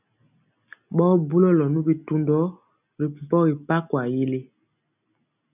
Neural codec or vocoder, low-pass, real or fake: none; 3.6 kHz; real